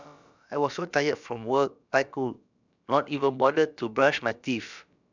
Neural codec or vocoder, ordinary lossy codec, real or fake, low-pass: codec, 16 kHz, about 1 kbps, DyCAST, with the encoder's durations; none; fake; 7.2 kHz